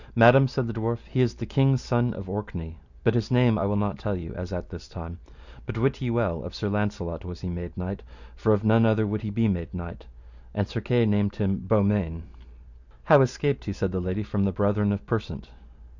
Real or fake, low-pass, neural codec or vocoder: real; 7.2 kHz; none